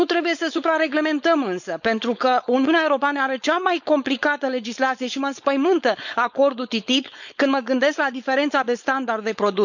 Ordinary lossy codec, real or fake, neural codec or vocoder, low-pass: none; fake; codec, 16 kHz, 4.8 kbps, FACodec; 7.2 kHz